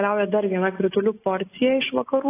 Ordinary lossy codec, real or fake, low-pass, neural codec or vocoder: AAC, 16 kbps; real; 3.6 kHz; none